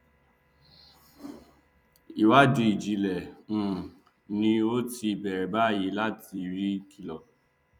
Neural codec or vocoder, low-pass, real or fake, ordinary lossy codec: vocoder, 44.1 kHz, 128 mel bands every 256 samples, BigVGAN v2; 19.8 kHz; fake; none